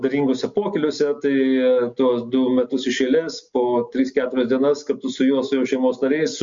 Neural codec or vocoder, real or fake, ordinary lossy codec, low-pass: none; real; MP3, 48 kbps; 7.2 kHz